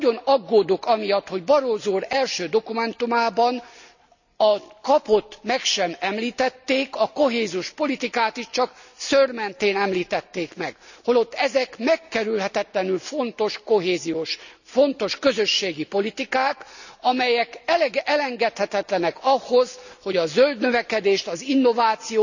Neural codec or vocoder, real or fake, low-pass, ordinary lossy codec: none; real; 7.2 kHz; none